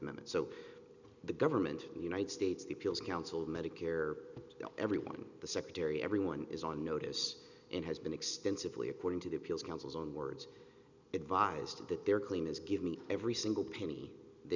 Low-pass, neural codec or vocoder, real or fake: 7.2 kHz; none; real